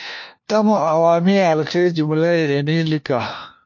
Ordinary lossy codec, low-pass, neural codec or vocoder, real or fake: MP3, 48 kbps; 7.2 kHz; codec, 16 kHz, 1 kbps, FunCodec, trained on LibriTTS, 50 frames a second; fake